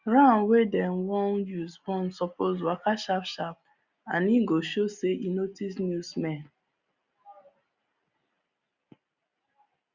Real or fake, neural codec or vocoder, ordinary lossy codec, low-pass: real; none; Opus, 64 kbps; 7.2 kHz